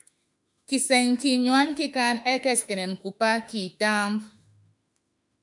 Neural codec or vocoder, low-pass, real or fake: autoencoder, 48 kHz, 32 numbers a frame, DAC-VAE, trained on Japanese speech; 10.8 kHz; fake